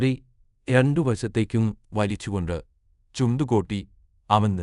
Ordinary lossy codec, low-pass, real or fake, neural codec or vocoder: none; 10.8 kHz; fake; codec, 24 kHz, 0.5 kbps, DualCodec